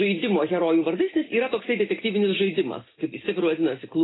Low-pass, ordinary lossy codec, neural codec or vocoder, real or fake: 7.2 kHz; AAC, 16 kbps; none; real